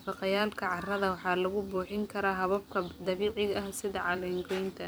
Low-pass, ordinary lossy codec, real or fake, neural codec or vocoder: none; none; fake; vocoder, 44.1 kHz, 128 mel bands every 256 samples, BigVGAN v2